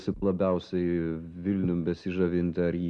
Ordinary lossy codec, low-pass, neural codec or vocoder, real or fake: MP3, 64 kbps; 10.8 kHz; none; real